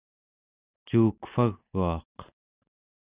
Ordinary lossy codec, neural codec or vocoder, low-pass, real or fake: Opus, 64 kbps; none; 3.6 kHz; real